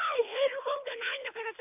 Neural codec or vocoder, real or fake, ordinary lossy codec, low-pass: codec, 24 kHz, 3 kbps, HILCodec; fake; MP3, 32 kbps; 3.6 kHz